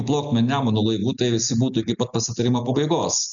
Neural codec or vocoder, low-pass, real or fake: none; 7.2 kHz; real